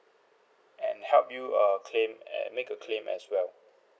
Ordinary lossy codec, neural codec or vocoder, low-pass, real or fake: none; none; none; real